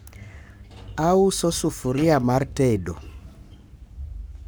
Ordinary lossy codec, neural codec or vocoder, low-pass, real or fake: none; codec, 44.1 kHz, 7.8 kbps, Pupu-Codec; none; fake